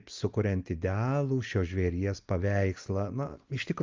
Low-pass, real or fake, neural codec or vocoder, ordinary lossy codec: 7.2 kHz; real; none; Opus, 24 kbps